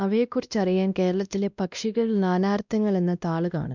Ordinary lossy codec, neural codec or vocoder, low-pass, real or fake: none; codec, 16 kHz, 1 kbps, X-Codec, WavLM features, trained on Multilingual LibriSpeech; 7.2 kHz; fake